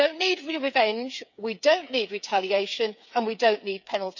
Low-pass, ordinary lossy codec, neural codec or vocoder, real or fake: 7.2 kHz; none; codec, 16 kHz, 8 kbps, FreqCodec, smaller model; fake